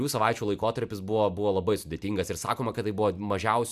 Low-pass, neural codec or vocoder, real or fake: 14.4 kHz; none; real